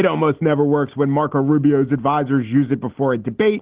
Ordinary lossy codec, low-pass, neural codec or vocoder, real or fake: Opus, 16 kbps; 3.6 kHz; none; real